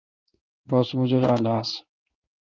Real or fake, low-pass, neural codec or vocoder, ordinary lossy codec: fake; 7.2 kHz; codec, 16 kHz in and 24 kHz out, 1 kbps, XY-Tokenizer; Opus, 24 kbps